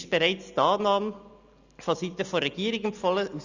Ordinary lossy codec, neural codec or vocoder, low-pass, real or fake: Opus, 64 kbps; none; 7.2 kHz; real